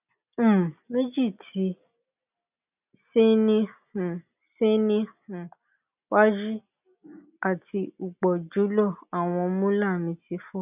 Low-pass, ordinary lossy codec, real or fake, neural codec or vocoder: 3.6 kHz; none; real; none